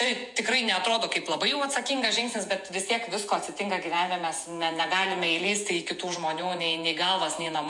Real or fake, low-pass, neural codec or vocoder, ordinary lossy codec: fake; 10.8 kHz; vocoder, 48 kHz, 128 mel bands, Vocos; MP3, 64 kbps